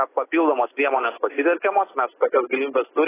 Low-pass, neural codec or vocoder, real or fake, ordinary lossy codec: 3.6 kHz; none; real; AAC, 16 kbps